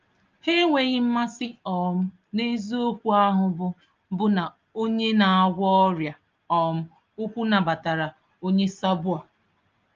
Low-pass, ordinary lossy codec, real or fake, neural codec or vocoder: 7.2 kHz; Opus, 24 kbps; real; none